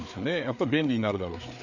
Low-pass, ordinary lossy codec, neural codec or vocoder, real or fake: 7.2 kHz; none; codec, 16 kHz, 4 kbps, FreqCodec, larger model; fake